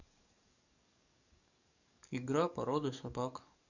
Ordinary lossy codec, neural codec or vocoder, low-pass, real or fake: none; codec, 44.1 kHz, 7.8 kbps, DAC; 7.2 kHz; fake